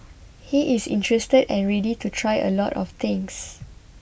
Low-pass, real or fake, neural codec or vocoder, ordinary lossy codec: none; real; none; none